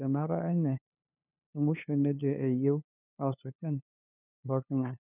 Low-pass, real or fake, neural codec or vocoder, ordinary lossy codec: 3.6 kHz; fake; codec, 16 kHz, 2 kbps, FunCodec, trained on LibriTTS, 25 frames a second; none